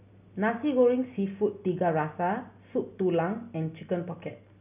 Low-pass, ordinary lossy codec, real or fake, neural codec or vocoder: 3.6 kHz; none; real; none